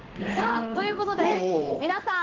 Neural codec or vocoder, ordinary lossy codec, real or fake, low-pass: codec, 16 kHz in and 24 kHz out, 1 kbps, XY-Tokenizer; Opus, 16 kbps; fake; 7.2 kHz